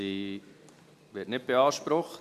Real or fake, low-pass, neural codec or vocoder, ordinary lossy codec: real; 14.4 kHz; none; MP3, 96 kbps